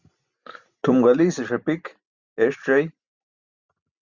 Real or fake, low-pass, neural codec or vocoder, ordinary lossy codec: real; 7.2 kHz; none; Opus, 64 kbps